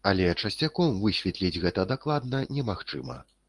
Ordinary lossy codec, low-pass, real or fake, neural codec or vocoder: Opus, 24 kbps; 10.8 kHz; real; none